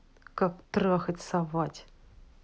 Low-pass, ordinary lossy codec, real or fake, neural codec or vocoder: none; none; real; none